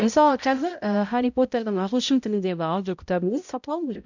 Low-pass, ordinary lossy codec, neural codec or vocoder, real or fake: 7.2 kHz; none; codec, 16 kHz, 0.5 kbps, X-Codec, HuBERT features, trained on balanced general audio; fake